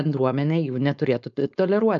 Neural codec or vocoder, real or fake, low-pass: codec, 16 kHz, 4.8 kbps, FACodec; fake; 7.2 kHz